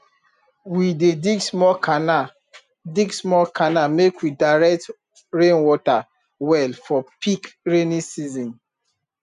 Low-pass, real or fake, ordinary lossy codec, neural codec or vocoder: 10.8 kHz; real; none; none